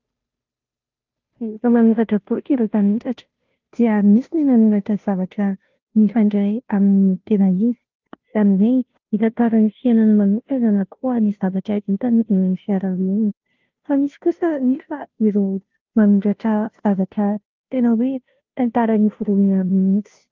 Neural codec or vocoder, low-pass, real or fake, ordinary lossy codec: codec, 16 kHz, 0.5 kbps, FunCodec, trained on Chinese and English, 25 frames a second; 7.2 kHz; fake; Opus, 24 kbps